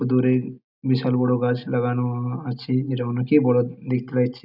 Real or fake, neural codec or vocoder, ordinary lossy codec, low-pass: real; none; none; 5.4 kHz